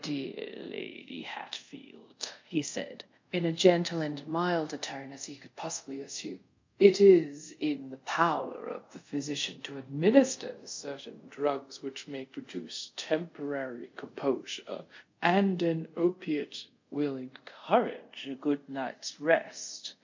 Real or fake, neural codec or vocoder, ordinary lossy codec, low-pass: fake; codec, 24 kHz, 0.5 kbps, DualCodec; MP3, 64 kbps; 7.2 kHz